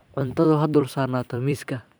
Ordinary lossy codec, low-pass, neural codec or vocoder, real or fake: none; none; vocoder, 44.1 kHz, 128 mel bands every 256 samples, BigVGAN v2; fake